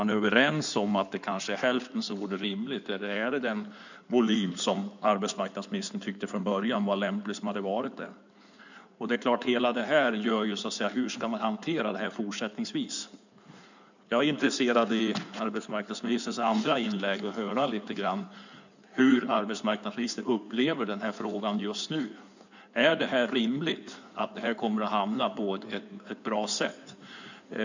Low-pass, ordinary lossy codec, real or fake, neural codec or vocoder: 7.2 kHz; none; fake; codec, 16 kHz in and 24 kHz out, 2.2 kbps, FireRedTTS-2 codec